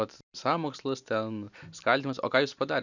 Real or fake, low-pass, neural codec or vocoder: real; 7.2 kHz; none